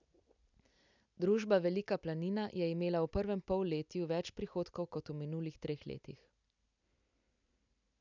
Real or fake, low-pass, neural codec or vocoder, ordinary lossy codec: real; 7.2 kHz; none; none